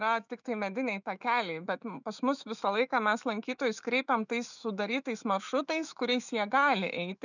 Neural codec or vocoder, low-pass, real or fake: autoencoder, 48 kHz, 128 numbers a frame, DAC-VAE, trained on Japanese speech; 7.2 kHz; fake